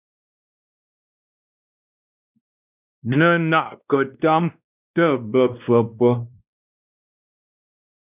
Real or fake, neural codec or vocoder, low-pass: fake; codec, 16 kHz, 1 kbps, X-Codec, WavLM features, trained on Multilingual LibriSpeech; 3.6 kHz